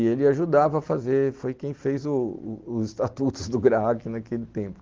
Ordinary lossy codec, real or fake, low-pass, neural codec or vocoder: Opus, 16 kbps; real; 7.2 kHz; none